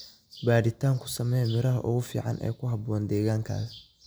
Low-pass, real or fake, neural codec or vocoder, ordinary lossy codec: none; real; none; none